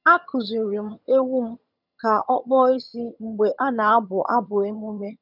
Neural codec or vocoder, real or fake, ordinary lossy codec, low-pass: vocoder, 22.05 kHz, 80 mel bands, HiFi-GAN; fake; none; 5.4 kHz